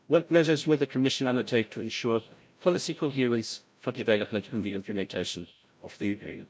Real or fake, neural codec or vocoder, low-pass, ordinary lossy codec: fake; codec, 16 kHz, 0.5 kbps, FreqCodec, larger model; none; none